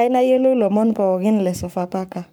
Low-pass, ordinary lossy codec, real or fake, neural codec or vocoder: none; none; fake; codec, 44.1 kHz, 7.8 kbps, Pupu-Codec